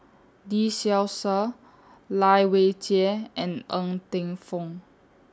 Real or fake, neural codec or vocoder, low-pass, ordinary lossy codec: real; none; none; none